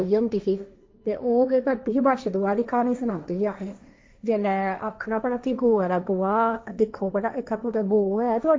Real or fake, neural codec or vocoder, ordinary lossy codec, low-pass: fake; codec, 16 kHz, 1.1 kbps, Voila-Tokenizer; none; none